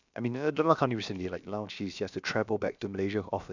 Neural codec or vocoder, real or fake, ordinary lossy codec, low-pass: codec, 16 kHz, about 1 kbps, DyCAST, with the encoder's durations; fake; none; 7.2 kHz